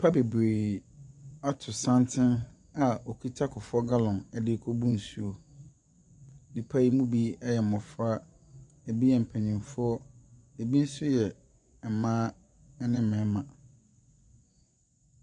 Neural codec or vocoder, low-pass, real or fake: vocoder, 24 kHz, 100 mel bands, Vocos; 10.8 kHz; fake